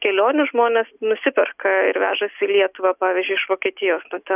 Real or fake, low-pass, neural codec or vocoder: real; 3.6 kHz; none